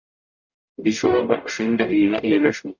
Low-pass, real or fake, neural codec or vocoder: 7.2 kHz; fake; codec, 44.1 kHz, 0.9 kbps, DAC